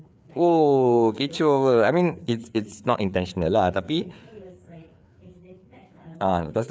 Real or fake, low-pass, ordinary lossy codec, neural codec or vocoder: fake; none; none; codec, 16 kHz, 4 kbps, FreqCodec, larger model